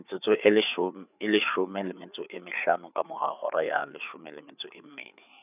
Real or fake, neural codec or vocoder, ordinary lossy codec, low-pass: fake; codec, 16 kHz, 4 kbps, FreqCodec, larger model; none; 3.6 kHz